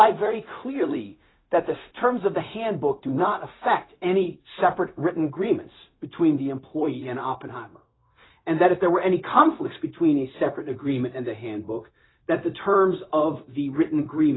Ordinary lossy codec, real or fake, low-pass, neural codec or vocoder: AAC, 16 kbps; fake; 7.2 kHz; codec, 16 kHz, 0.4 kbps, LongCat-Audio-Codec